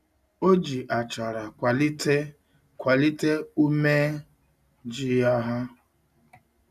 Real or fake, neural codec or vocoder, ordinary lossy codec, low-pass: real; none; none; 14.4 kHz